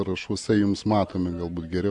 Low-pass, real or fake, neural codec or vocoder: 10.8 kHz; real; none